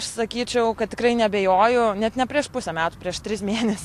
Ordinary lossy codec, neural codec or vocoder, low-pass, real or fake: AAC, 64 kbps; none; 14.4 kHz; real